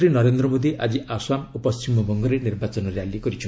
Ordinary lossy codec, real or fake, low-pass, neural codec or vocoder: none; real; none; none